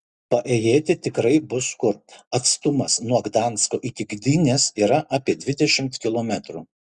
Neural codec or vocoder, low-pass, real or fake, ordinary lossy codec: none; 10.8 kHz; real; Opus, 64 kbps